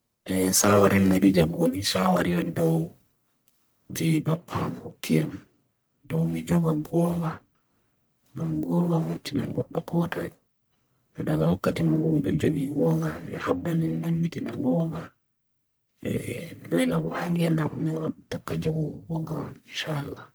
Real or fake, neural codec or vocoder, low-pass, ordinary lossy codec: fake; codec, 44.1 kHz, 1.7 kbps, Pupu-Codec; none; none